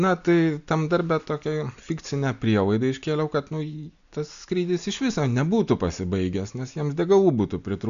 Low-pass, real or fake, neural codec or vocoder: 7.2 kHz; real; none